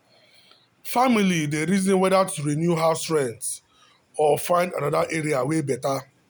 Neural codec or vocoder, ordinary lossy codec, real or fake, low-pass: none; none; real; none